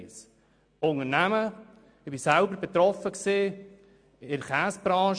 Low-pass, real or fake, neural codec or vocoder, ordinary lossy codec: 9.9 kHz; real; none; MP3, 64 kbps